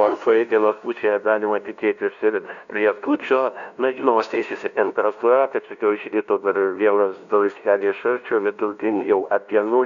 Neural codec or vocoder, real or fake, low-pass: codec, 16 kHz, 0.5 kbps, FunCodec, trained on LibriTTS, 25 frames a second; fake; 7.2 kHz